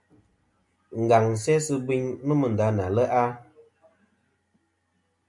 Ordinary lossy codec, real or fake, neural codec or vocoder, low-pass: MP3, 64 kbps; real; none; 10.8 kHz